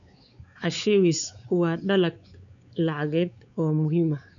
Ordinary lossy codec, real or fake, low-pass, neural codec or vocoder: none; fake; 7.2 kHz; codec, 16 kHz, 4 kbps, X-Codec, WavLM features, trained on Multilingual LibriSpeech